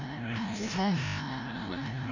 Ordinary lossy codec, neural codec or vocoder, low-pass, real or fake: none; codec, 16 kHz, 0.5 kbps, FreqCodec, larger model; 7.2 kHz; fake